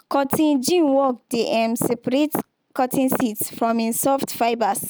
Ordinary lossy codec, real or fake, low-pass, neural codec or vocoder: none; real; none; none